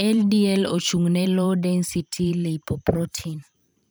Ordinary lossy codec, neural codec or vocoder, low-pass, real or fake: none; vocoder, 44.1 kHz, 128 mel bands every 512 samples, BigVGAN v2; none; fake